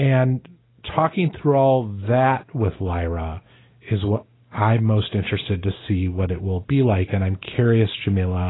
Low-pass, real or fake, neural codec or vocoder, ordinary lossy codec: 7.2 kHz; real; none; AAC, 16 kbps